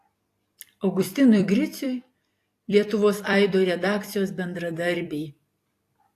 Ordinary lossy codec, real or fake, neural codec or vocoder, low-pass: AAC, 64 kbps; fake; vocoder, 44.1 kHz, 128 mel bands every 256 samples, BigVGAN v2; 14.4 kHz